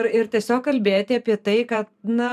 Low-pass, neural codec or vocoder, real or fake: 14.4 kHz; vocoder, 44.1 kHz, 128 mel bands every 512 samples, BigVGAN v2; fake